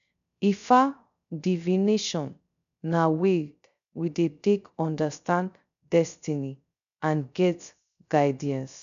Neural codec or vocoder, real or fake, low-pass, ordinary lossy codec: codec, 16 kHz, 0.3 kbps, FocalCodec; fake; 7.2 kHz; none